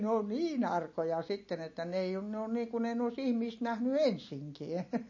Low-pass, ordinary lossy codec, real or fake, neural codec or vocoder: 7.2 kHz; MP3, 32 kbps; real; none